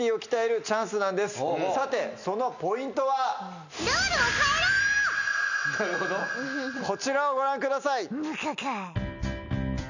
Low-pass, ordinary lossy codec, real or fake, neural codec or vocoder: 7.2 kHz; none; real; none